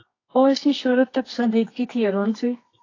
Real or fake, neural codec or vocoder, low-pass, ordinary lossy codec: fake; codec, 24 kHz, 0.9 kbps, WavTokenizer, medium music audio release; 7.2 kHz; AAC, 32 kbps